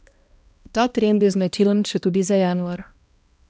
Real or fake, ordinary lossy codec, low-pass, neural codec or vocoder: fake; none; none; codec, 16 kHz, 1 kbps, X-Codec, HuBERT features, trained on balanced general audio